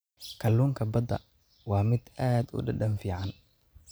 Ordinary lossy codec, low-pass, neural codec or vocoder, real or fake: none; none; none; real